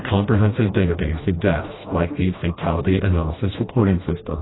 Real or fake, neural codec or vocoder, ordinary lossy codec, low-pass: fake; codec, 16 kHz, 1 kbps, FreqCodec, smaller model; AAC, 16 kbps; 7.2 kHz